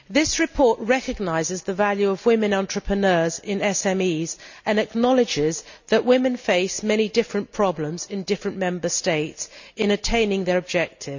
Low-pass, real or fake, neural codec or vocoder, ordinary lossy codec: 7.2 kHz; real; none; none